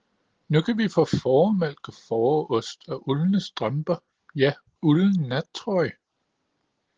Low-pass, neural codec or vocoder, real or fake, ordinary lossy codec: 7.2 kHz; none; real; Opus, 16 kbps